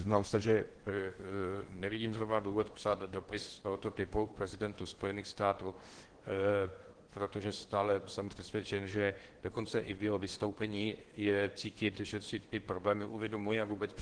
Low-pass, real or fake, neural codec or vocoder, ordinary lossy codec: 9.9 kHz; fake; codec, 16 kHz in and 24 kHz out, 0.8 kbps, FocalCodec, streaming, 65536 codes; Opus, 16 kbps